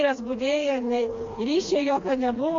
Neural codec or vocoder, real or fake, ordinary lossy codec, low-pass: codec, 16 kHz, 2 kbps, FreqCodec, smaller model; fake; AAC, 48 kbps; 7.2 kHz